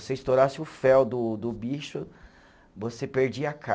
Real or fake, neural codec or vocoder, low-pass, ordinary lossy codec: real; none; none; none